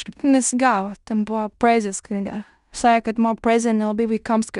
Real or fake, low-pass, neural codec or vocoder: fake; 10.8 kHz; codec, 16 kHz in and 24 kHz out, 0.9 kbps, LongCat-Audio-Codec, fine tuned four codebook decoder